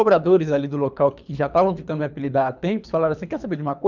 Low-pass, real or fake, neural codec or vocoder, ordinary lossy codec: 7.2 kHz; fake; codec, 24 kHz, 3 kbps, HILCodec; none